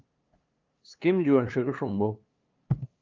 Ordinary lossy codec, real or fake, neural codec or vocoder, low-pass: Opus, 32 kbps; fake; codec, 16 kHz, 2 kbps, FunCodec, trained on LibriTTS, 25 frames a second; 7.2 kHz